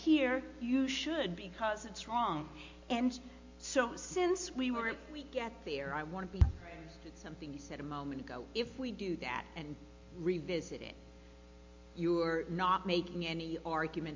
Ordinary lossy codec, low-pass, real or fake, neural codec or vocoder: MP3, 48 kbps; 7.2 kHz; real; none